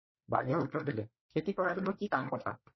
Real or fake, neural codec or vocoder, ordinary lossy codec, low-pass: fake; codec, 24 kHz, 1 kbps, SNAC; MP3, 24 kbps; 7.2 kHz